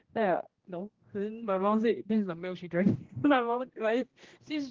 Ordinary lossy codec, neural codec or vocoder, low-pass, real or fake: Opus, 16 kbps; codec, 16 kHz, 1 kbps, X-Codec, HuBERT features, trained on general audio; 7.2 kHz; fake